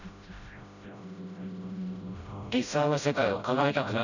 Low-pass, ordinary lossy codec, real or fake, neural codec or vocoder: 7.2 kHz; none; fake; codec, 16 kHz, 0.5 kbps, FreqCodec, smaller model